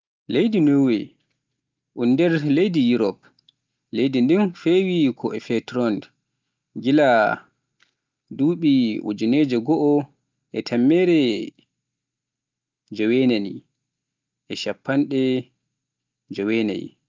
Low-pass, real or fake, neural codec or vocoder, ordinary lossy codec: 7.2 kHz; real; none; Opus, 24 kbps